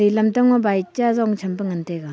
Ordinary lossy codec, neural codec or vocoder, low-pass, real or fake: none; none; none; real